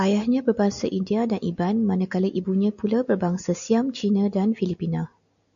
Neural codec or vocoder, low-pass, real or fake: none; 7.2 kHz; real